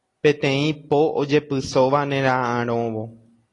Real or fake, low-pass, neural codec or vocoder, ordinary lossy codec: real; 10.8 kHz; none; AAC, 32 kbps